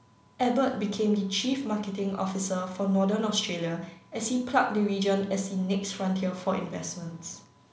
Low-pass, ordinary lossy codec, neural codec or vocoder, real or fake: none; none; none; real